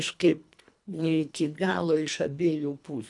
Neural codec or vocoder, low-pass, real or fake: codec, 24 kHz, 1.5 kbps, HILCodec; 10.8 kHz; fake